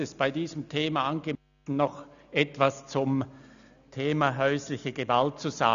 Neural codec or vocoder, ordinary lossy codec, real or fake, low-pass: none; none; real; 7.2 kHz